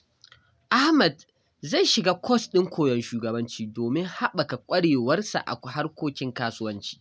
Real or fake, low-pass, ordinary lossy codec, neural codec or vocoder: real; none; none; none